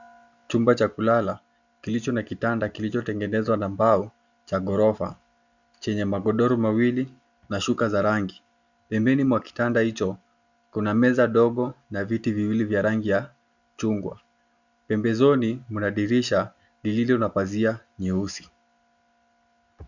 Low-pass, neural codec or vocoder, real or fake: 7.2 kHz; none; real